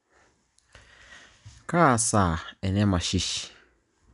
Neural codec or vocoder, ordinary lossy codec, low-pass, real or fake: none; none; 10.8 kHz; real